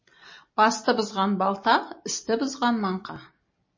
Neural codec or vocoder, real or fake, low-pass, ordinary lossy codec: none; real; 7.2 kHz; MP3, 32 kbps